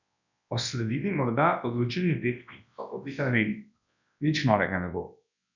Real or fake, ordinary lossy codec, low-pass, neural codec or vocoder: fake; none; 7.2 kHz; codec, 24 kHz, 0.9 kbps, WavTokenizer, large speech release